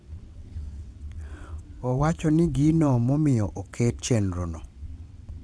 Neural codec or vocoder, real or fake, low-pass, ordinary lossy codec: vocoder, 22.05 kHz, 80 mel bands, WaveNeXt; fake; none; none